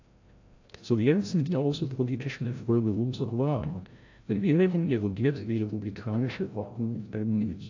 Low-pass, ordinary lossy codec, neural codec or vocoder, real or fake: 7.2 kHz; AAC, 48 kbps; codec, 16 kHz, 0.5 kbps, FreqCodec, larger model; fake